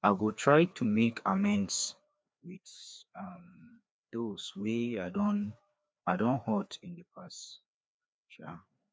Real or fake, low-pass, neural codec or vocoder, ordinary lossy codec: fake; none; codec, 16 kHz, 2 kbps, FreqCodec, larger model; none